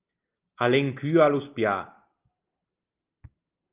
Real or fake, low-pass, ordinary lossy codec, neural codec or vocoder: real; 3.6 kHz; Opus, 32 kbps; none